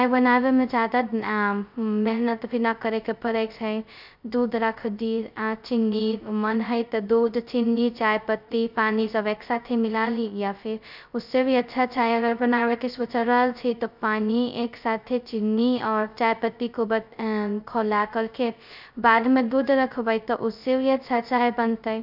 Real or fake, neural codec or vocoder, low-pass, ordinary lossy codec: fake; codec, 16 kHz, 0.2 kbps, FocalCodec; 5.4 kHz; none